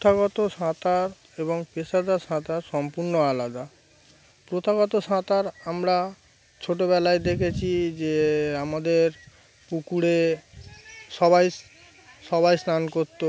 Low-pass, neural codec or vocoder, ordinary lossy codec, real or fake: none; none; none; real